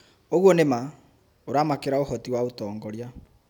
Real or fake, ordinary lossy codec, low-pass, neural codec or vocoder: real; none; none; none